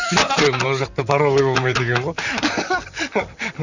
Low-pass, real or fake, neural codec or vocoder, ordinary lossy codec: 7.2 kHz; fake; vocoder, 44.1 kHz, 128 mel bands, Pupu-Vocoder; none